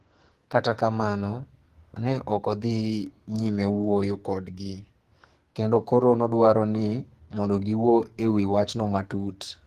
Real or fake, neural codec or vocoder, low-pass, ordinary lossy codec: fake; codec, 44.1 kHz, 2.6 kbps, SNAC; 14.4 kHz; Opus, 24 kbps